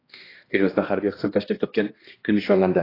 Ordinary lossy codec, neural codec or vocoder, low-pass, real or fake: AAC, 24 kbps; codec, 16 kHz, 1 kbps, X-Codec, HuBERT features, trained on balanced general audio; 5.4 kHz; fake